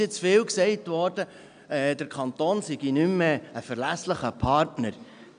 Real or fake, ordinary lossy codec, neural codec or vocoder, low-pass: real; none; none; 9.9 kHz